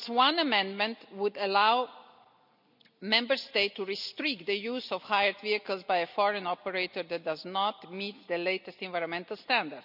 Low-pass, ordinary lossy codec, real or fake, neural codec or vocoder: 5.4 kHz; none; real; none